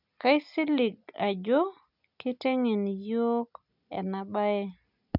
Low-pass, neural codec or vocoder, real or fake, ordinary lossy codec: 5.4 kHz; none; real; none